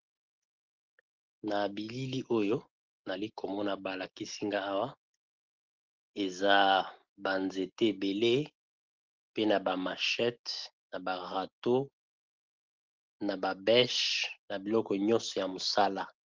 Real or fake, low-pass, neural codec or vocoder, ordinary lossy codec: real; 7.2 kHz; none; Opus, 16 kbps